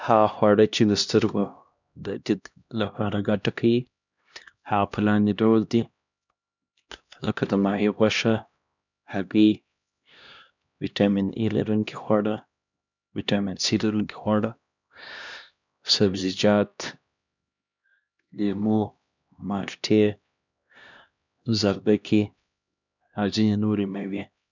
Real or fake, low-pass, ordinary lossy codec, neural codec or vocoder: fake; 7.2 kHz; none; codec, 16 kHz, 1 kbps, X-Codec, HuBERT features, trained on LibriSpeech